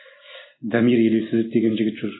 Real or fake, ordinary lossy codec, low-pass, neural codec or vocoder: real; AAC, 16 kbps; 7.2 kHz; none